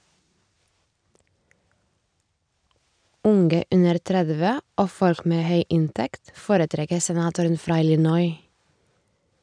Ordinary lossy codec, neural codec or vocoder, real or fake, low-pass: none; none; real; 9.9 kHz